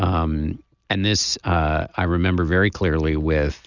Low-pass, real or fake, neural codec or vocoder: 7.2 kHz; real; none